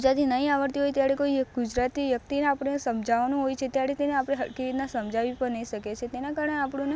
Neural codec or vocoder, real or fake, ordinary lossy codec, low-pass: none; real; none; none